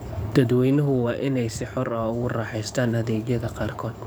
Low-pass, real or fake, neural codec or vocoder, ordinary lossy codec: none; fake; codec, 44.1 kHz, 7.8 kbps, DAC; none